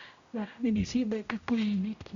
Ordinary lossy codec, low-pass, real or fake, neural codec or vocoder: Opus, 64 kbps; 7.2 kHz; fake; codec, 16 kHz, 0.5 kbps, X-Codec, HuBERT features, trained on general audio